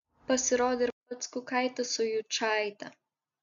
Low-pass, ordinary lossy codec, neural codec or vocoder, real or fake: 7.2 kHz; MP3, 96 kbps; none; real